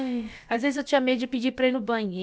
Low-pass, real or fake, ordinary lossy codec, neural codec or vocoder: none; fake; none; codec, 16 kHz, about 1 kbps, DyCAST, with the encoder's durations